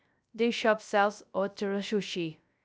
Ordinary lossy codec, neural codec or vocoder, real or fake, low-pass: none; codec, 16 kHz, 0.3 kbps, FocalCodec; fake; none